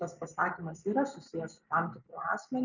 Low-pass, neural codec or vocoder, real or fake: 7.2 kHz; none; real